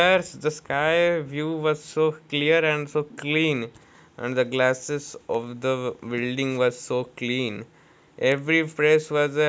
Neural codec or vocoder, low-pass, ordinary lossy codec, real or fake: none; none; none; real